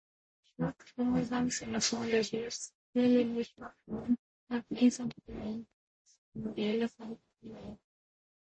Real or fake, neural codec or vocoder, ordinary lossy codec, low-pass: fake; codec, 44.1 kHz, 0.9 kbps, DAC; MP3, 32 kbps; 9.9 kHz